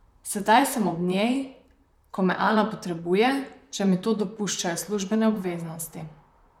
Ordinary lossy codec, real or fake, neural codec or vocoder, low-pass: MP3, 96 kbps; fake; vocoder, 44.1 kHz, 128 mel bands, Pupu-Vocoder; 19.8 kHz